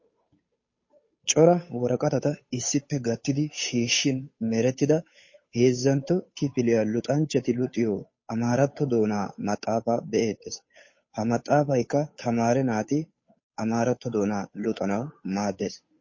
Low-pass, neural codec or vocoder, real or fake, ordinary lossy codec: 7.2 kHz; codec, 16 kHz, 8 kbps, FunCodec, trained on Chinese and English, 25 frames a second; fake; MP3, 32 kbps